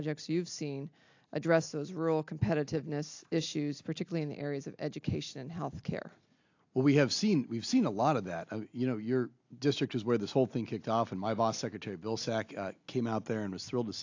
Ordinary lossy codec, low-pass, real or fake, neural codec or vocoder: AAC, 48 kbps; 7.2 kHz; real; none